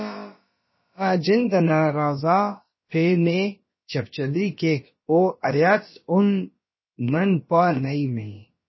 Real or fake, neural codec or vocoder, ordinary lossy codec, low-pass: fake; codec, 16 kHz, about 1 kbps, DyCAST, with the encoder's durations; MP3, 24 kbps; 7.2 kHz